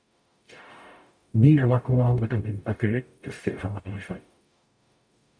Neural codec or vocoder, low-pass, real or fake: codec, 44.1 kHz, 0.9 kbps, DAC; 9.9 kHz; fake